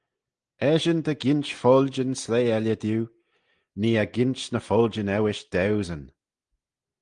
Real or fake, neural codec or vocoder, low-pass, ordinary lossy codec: real; none; 9.9 kHz; Opus, 24 kbps